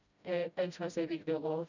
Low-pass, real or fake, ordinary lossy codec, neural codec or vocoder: 7.2 kHz; fake; none; codec, 16 kHz, 0.5 kbps, FreqCodec, smaller model